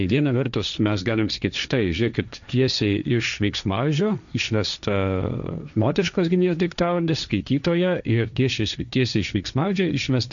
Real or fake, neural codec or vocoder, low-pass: fake; codec, 16 kHz, 1.1 kbps, Voila-Tokenizer; 7.2 kHz